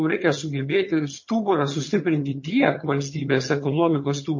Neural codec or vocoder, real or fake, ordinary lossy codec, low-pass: vocoder, 22.05 kHz, 80 mel bands, HiFi-GAN; fake; MP3, 32 kbps; 7.2 kHz